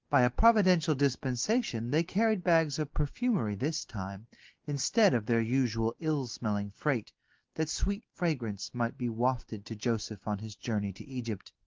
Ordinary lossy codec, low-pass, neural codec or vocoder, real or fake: Opus, 16 kbps; 7.2 kHz; none; real